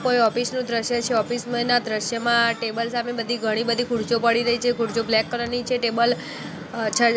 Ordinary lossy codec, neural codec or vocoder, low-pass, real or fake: none; none; none; real